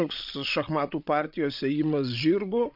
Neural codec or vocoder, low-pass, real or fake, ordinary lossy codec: codec, 16 kHz, 16 kbps, FreqCodec, larger model; 5.4 kHz; fake; AAC, 48 kbps